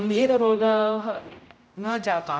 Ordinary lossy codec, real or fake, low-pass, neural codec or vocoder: none; fake; none; codec, 16 kHz, 0.5 kbps, X-Codec, HuBERT features, trained on general audio